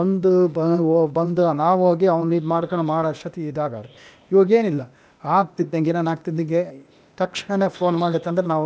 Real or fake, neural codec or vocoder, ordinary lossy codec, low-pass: fake; codec, 16 kHz, 0.8 kbps, ZipCodec; none; none